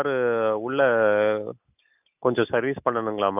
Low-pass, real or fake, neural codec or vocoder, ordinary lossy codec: 3.6 kHz; real; none; none